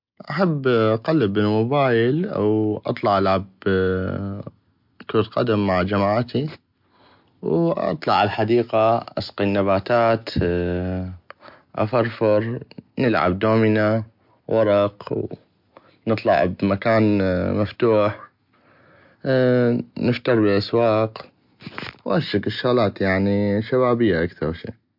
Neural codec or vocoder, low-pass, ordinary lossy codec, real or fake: none; 5.4 kHz; MP3, 48 kbps; real